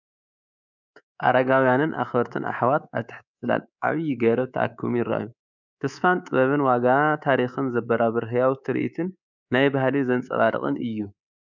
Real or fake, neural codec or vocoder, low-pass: fake; codec, 24 kHz, 3.1 kbps, DualCodec; 7.2 kHz